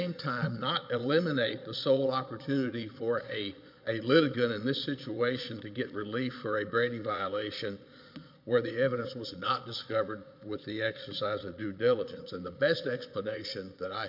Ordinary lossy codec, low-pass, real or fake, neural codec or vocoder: MP3, 48 kbps; 5.4 kHz; fake; vocoder, 22.05 kHz, 80 mel bands, Vocos